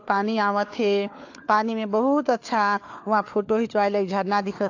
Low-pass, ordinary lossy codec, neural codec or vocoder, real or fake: 7.2 kHz; AAC, 48 kbps; codec, 16 kHz, 4 kbps, FunCodec, trained on LibriTTS, 50 frames a second; fake